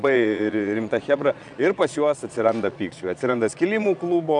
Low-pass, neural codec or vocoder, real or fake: 9.9 kHz; vocoder, 22.05 kHz, 80 mel bands, Vocos; fake